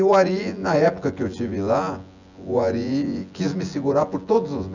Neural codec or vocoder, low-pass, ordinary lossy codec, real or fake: vocoder, 24 kHz, 100 mel bands, Vocos; 7.2 kHz; none; fake